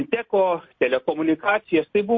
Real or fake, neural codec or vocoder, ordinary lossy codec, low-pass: real; none; MP3, 32 kbps; 7.2 kHz